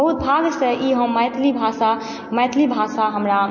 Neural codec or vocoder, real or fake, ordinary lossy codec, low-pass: none; real; MP3, 32 kbps; 7.2 kHz